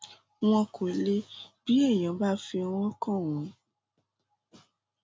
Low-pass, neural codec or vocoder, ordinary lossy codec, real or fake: none; none; none; real